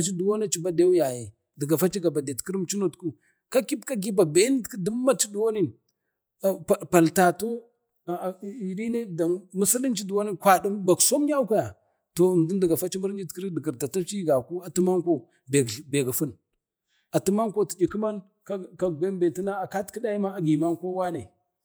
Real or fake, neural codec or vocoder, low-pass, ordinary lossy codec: fake; vocoder, 48 kHz, 128 mel bands, Vocos; none; none